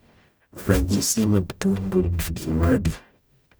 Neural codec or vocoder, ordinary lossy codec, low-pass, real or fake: codec, 44.1 kHz, 0.9 kbps, DAC; none; none; fake